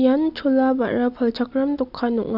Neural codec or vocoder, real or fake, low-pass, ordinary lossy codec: none; real; 5.4 kHz; none